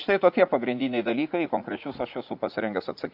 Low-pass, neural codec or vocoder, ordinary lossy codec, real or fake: 5.4 kHz; vocoder, 44.1 kHz, 80 mel bands, Vocos; AAC, 48 kbps; fake